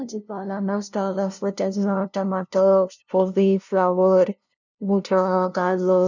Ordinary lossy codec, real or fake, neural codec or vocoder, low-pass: none; fake; codec, 16 kHz, 0.5 kbps, FunCodec, trained on LibriTTS, 25 frames a second; 7.2 kHz